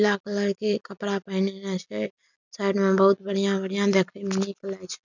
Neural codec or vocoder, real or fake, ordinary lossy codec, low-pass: none; real; none; 7.2 kHz